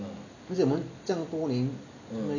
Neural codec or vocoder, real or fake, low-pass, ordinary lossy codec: none; real; 7.2 kHz; none